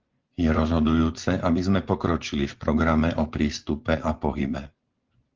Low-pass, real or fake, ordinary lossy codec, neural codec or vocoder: 7.2 kHz; fake; Opus, 16 kbps; vocoder, 24 kHz, 100 mel bands, Vocos